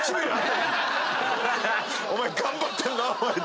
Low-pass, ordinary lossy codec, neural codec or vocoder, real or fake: none; none; none; real